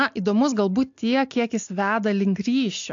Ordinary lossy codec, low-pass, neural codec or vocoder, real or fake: AAC, 64 kbps; 7.2 kHz; none; real